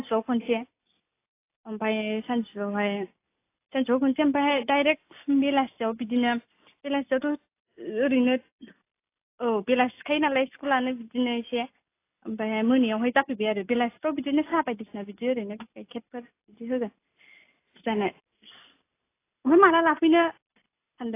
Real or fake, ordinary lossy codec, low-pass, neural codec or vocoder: real; AAC, 24 kbps; 3.6 kHz; none